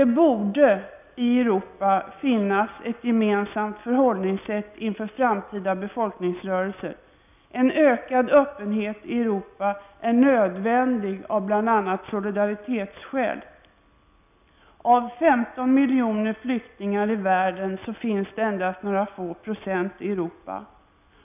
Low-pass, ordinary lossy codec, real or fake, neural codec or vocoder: 3.6 kHz; none; real; none